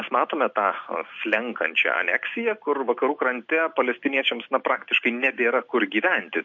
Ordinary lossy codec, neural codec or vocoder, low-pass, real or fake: MP3, 48 kbps; none; 7.2 kHz; real